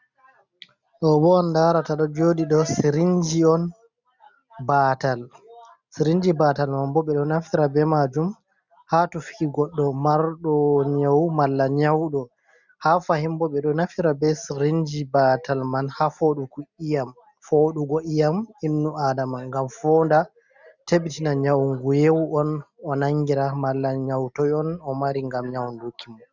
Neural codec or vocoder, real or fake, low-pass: none; real; 7.2 kHz